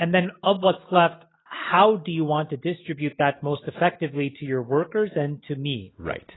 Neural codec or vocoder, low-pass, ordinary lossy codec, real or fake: none; 7.2 kHz; AAC, 16 kbps; real